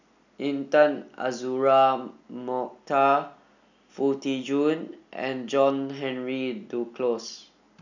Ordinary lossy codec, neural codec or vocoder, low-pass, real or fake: none; none; 7.2 kHz; real